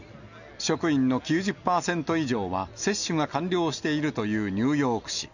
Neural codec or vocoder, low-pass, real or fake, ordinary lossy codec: none; 7.2 kHz; real; none